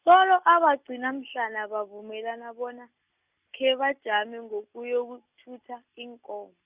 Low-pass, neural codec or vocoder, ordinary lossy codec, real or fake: 3.6 kHz; none; Opus, 64 kbps; real